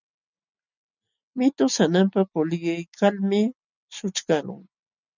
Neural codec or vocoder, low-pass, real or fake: none; 7.2 kHz; real